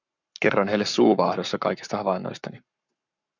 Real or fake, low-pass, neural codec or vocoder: fake; 7.2 kHz; codec, 44.1 kHz, 7.8 kbps, Pupu-Codec